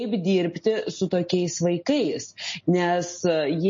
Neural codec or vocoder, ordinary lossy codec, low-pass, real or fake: none; MP3, 32 kbps; 7.2 kHz; real